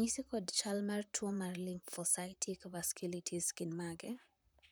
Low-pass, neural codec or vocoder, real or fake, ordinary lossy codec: none; vocoder, 44.1 kHz, 128 mel bands, Pupu-Vocoder; fake; none